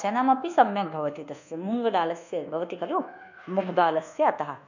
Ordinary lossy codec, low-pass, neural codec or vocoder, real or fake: none; 7.2 kHz; codec, 24 kHz, 1.2 kbps, DualCodec; fake